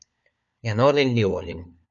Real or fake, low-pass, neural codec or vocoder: fake; 7.2 kHz; codec, 16 kHz, 4 kbps, FunCodec, trained on LibriTTS, 50 frames a second